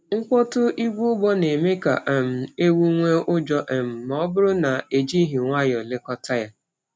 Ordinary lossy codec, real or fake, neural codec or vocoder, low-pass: none; real; none; none